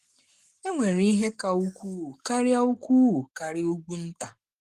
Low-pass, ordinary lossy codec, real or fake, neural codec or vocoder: 14.4 kHz; Opus, 24 kbps; fake; codec, 44.1 kHz, 7.8 kbps, Pupu-Codec